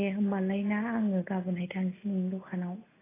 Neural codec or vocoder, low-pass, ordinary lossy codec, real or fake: none; 3.6 kHz; AAC, 16 kbps; real